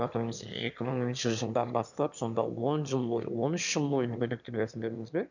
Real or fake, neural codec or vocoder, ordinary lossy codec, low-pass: fake; autoencoder, 22.05 kHz, a latent of 192 numbers a frame, VITS, trained on one speaker; none; 7.2 kHz